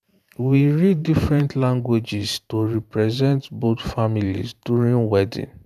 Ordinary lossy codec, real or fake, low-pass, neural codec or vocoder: none; fake; 14.4 kHz; vocoder, 44.1 kHz, 128 mel bands every 512 samples, BigVGAN v2